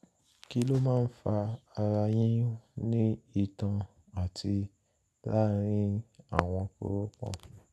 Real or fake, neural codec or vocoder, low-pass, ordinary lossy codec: real; none; none; none